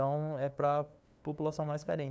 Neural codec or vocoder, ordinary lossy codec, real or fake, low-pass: codec, 16 kHz, 2 kbps, FunCodec, trained on LibriTTS, 25 frames a second; none; fake; none